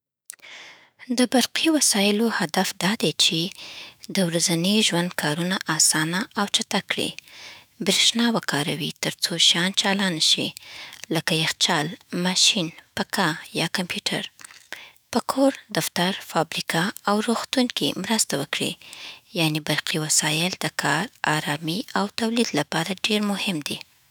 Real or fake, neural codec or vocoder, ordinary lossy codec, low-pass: fake; autoencoder, 48 kHz, 128 numbers a frame, DAC-VAE, trained on Japanese speech; none; none